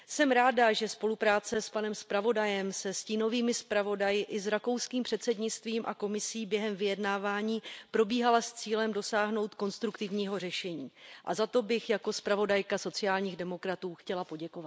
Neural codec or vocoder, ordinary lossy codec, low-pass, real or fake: none; none; none; real